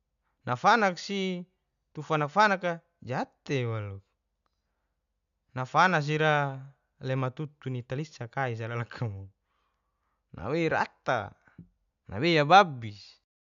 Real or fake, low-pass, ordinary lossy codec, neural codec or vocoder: real; 7.2 kHz; none; none